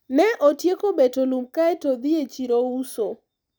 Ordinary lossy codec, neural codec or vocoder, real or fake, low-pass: none; none; real; none